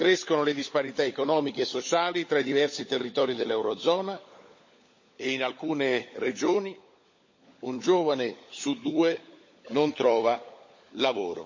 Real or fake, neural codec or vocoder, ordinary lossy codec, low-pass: fake; codec, 16 kHz, 16 kbps, FunCodec, trained on LibriTTS, 50 frames a second; MP3, 32 kbps; 7.2 kHz